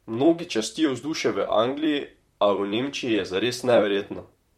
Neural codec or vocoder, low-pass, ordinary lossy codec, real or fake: vocoder, 44.1 kHz, 128 mel bands, Pupu-Vocoder; 19.8 kHz; MP3, 64 kbps; fake